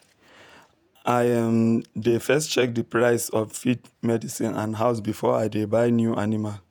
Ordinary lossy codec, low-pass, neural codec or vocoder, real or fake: none; none; vocoder, 48 kHz, 128 mel bands, Vocos; fake